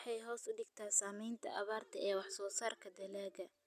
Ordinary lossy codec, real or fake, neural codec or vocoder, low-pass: none; real; none; 14.4 kHz